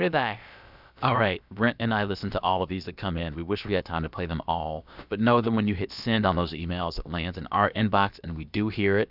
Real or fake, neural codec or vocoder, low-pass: fake; codec, 16 kHz, about 1 kbps, DyCAST, with the encoder's durations; 5.4 kHz